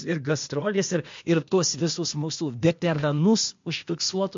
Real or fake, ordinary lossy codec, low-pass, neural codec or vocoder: fake; MP3, 48 kbps; 7.2 kHz; codec, 16 kHz, 0.8 kbps, ZipCodec